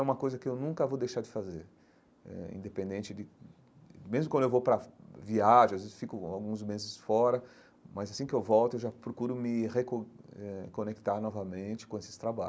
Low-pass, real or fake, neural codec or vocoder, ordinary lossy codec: none; real; none; none